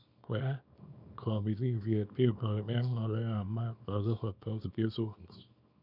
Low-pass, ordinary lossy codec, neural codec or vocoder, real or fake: 5.4 kHz; none; codec, 24 kHz, 0.9 kbps, WavTokenizer, small release; fake